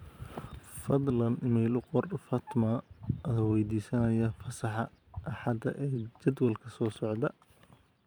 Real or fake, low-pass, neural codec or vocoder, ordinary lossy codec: fake; none; vocoder, 44.1 kHz, 128 mel bands every 512 samples, BigVGAN v2; none